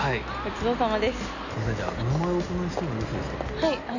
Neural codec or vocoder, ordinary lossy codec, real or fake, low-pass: none; none; real; 7.2 kHz